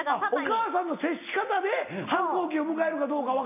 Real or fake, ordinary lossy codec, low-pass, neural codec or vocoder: real; none; 3.6 kHz; none